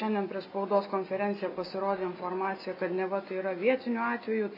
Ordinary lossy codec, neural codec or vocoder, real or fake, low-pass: AAC, 32 kbps; none; real; 5.4 kHz